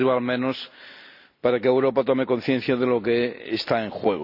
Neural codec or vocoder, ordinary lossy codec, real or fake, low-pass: none; none; real; 5.4 kHz